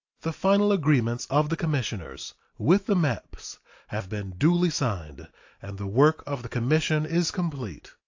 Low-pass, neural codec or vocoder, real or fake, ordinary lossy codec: 7.2 kHz; none; real; AAC, 48 kbps